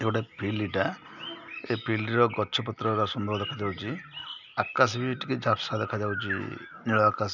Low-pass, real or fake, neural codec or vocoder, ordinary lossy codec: 7.2 kHz; real; none; none